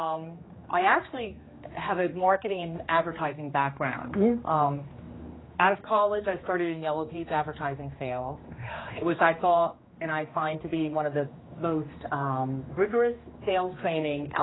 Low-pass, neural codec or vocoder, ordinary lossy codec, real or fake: 7.2 kHz; codec, 16 kHz, 2 kbps, X-Codec, HuBERT features, trained on general audio; AAC, 16 kbps; fake